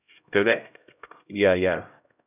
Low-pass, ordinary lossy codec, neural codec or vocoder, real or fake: 3.6 kHz; none; codec, 16 kHz, 0.5 kbps, X-Codec, HuBERT features, trained on LibriSpeech; fake